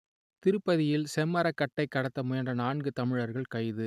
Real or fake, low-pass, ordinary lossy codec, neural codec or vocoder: real; 14.4 kHz; none; none